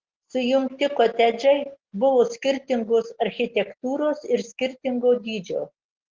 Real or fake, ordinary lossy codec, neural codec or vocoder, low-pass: real; Opus, 16 kbps; none; 7.2 kHz